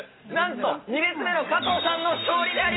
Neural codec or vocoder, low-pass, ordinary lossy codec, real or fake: none; 7.2 kHz; AAC, 16 kbps; real